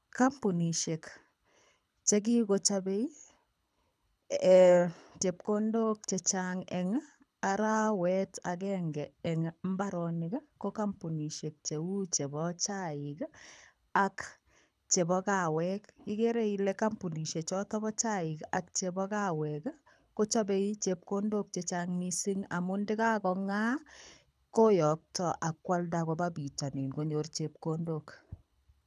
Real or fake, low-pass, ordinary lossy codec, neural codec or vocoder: fake; none; none; codec, 24 kHz, 6 kbps, HILCodec